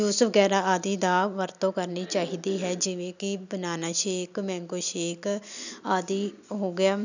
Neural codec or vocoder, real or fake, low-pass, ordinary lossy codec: none; real; 7.2 kHz; none